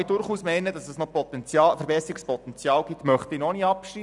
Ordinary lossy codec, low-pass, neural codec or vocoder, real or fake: none; 10.8 kHz; none; real